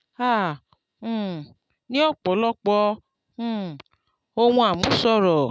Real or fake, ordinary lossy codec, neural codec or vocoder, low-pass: real; none; none; none